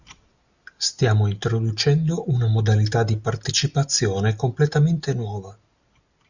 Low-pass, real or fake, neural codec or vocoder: 7.2 kHz; real; none